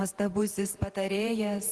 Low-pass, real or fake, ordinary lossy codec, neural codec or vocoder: 10.8 kHz; fake; Opus, 16 kbps; vocoder, 48 kHz, 128 mel bands, Vocos